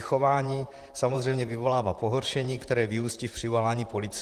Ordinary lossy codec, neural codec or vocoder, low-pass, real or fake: Opus, 24 kbps; vocoder, 44.1 kHz, 128 mel bands, Pupu-Vocoder; 14.4 kHz; fake